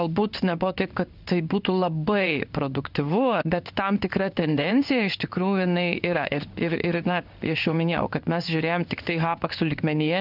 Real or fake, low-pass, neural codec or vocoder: fake; 5.4 kHz; codec, 16 kHz in and 24 kHz out, 1 kbps, XY-Tokenizer